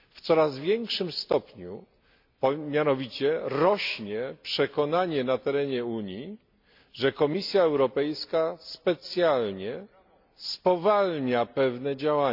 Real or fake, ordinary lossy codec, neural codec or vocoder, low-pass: real; MP3, 48 kbps; none; 5.4 kHz